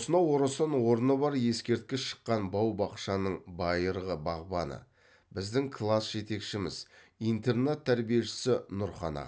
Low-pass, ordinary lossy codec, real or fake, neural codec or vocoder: none; none; real; none